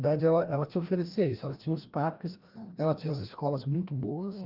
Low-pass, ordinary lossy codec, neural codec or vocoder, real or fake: 5.4 kHz; Opus, 32 kbps; codec, 16 kHz, 1 kbps, FreqCodec, larger model; fake